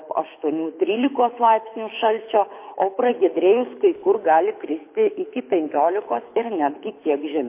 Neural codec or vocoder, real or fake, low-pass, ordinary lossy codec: codec, 24 kHz, 6 kbps, HILCodec; fake; 3.6 kHz; MP3, 24 kbps